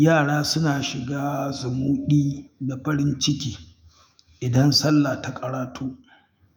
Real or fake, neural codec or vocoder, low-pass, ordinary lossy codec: fake; autoencoder, 48 kHz, 128 numbers a frame, DAC-VAE, trained on Japanese speech; none; none